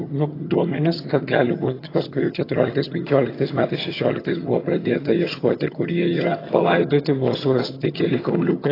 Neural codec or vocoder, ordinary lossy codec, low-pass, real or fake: vocoder, 22.05 kHz, 80 mel bands, HiFi-GAN; AAC, 24 kbps; 5.4 kHz; fake